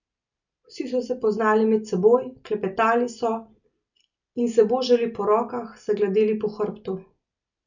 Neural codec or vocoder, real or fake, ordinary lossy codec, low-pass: none; real; none; 7.2 kHz